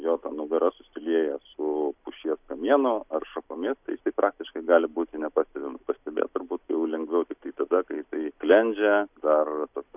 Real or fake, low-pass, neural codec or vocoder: real; 3.6 kHz; none